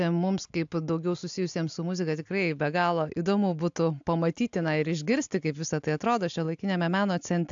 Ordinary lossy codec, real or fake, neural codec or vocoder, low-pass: AAC, 64 kbps; real; none; 7.2 kHz